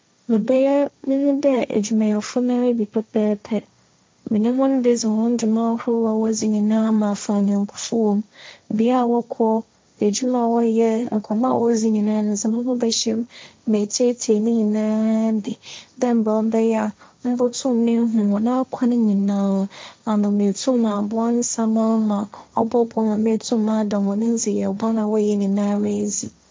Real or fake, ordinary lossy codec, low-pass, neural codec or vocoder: fake; none; none; codec, 16 kHz, 1.1 kbps, Voila-Tokenizer